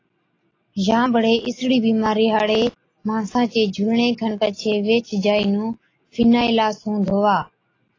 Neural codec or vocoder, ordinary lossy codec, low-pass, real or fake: none; AAC, 32 kbps; 7.2 kHz; real